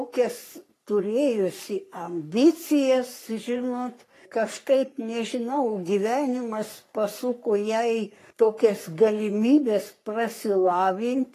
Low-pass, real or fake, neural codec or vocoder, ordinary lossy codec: 14.4 kHz; fake; codec, 44.1 kHz, 3.4 kbps, Pupu-Codec; AAC, 48 kbps